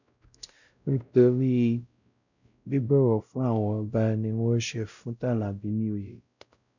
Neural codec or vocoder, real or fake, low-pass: codec, 16 kHz, 0.5 kbps, X-Codec, WavLM features, trained on Multilingual LibriSpeech; fake; 7.2 kHz